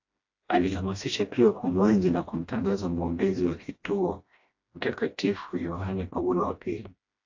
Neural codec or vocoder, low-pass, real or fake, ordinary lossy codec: codec, 16 kHz, 1 kbps, FreqCodec, smaller model; 7.2 kHz; fake; AAC, 48 kbps